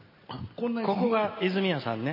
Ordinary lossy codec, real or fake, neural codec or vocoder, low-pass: MP3, 24 kbps; real; none; 5.4 kHz